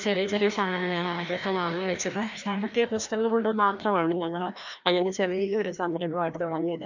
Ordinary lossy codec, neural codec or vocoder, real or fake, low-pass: none; codec, 16 kHz, 1 kbps, FreqCodec, larger model; fake; 7.2 kHz